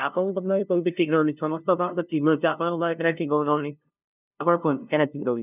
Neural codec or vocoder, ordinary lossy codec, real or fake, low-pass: codec, 16 kHz, 0.5 kbps, FunCodec, trained on LibriTTS, 25 frames a second; none; fake; 3.6 kHz